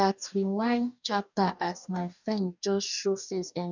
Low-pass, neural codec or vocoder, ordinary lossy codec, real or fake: 7.2 kHz; codec, 44.1 kHz, 2.6 kbps, DAC; none; fake